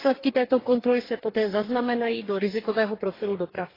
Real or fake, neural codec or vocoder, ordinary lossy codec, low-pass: fake; codec, 44.1 kHz, 2.6 kbps, DAC; AAC, 24 kbps; 5.4 kHz